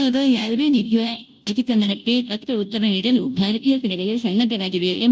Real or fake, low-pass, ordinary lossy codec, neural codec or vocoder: fake; none; none; codec, 16 kHz, 0.5 kbps, FunCodec, trained on Chinese and English, 25 frames a second